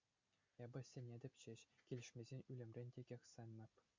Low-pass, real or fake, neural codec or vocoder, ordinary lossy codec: 7.2 kHz; real; none; MP3, 64 kbps